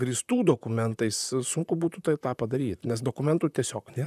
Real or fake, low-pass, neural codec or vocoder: fake; 14.4 kHz; vocoder, 44.1 kHz, 128 mel bands, Pupu-Vocoder